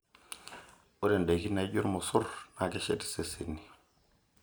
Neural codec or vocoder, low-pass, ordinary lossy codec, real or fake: none; none; none; real